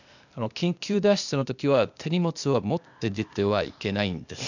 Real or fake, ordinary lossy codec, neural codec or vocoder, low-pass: fake; none; codec, 16 kHz, 0.8 kbps, ZipCodec; 7.2 kHz